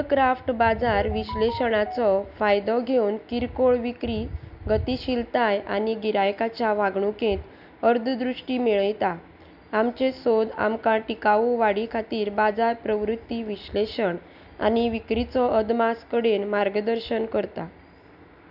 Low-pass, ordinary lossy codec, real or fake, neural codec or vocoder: 5.4 kHz; none; real; none